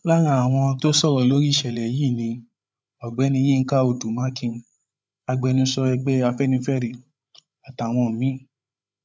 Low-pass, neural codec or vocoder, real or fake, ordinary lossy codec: none; codec, 16 kHz, 8 kbps, FreqCodec, larger model; fake; none